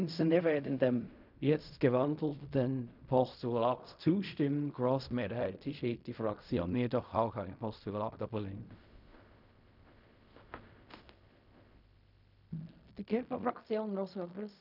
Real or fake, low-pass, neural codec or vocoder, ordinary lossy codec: fake; 5.4 kHz; codec, 16 kHz in and 24 kHz out, 0.4 kbps, LongCat-Audio-Codec, fine tuned four codebook decoder; none